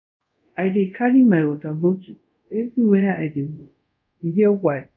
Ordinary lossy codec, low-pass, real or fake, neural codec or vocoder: MP3, 64 kbps; 7.2 kHz; fake; codec, 24 kHz, 0.5 kbps, DualCodec